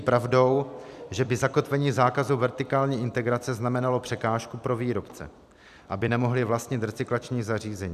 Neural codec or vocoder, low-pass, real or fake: vocoder, 44.1 kHz, 128 mel bands every 256 samples, BigVGAN v2; 14.4 kHz; fake